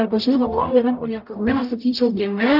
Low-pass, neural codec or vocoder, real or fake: 5.4 kHz; codec, 44.1 kHz, 0.9 kbps, DAC; fake